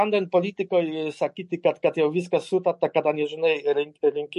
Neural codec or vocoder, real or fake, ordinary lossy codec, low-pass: none; real; MP3, 48 kbps; 14.4 kHz